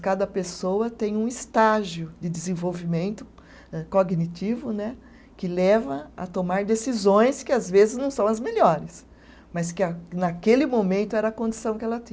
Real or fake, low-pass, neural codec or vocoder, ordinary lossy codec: real; none; none; none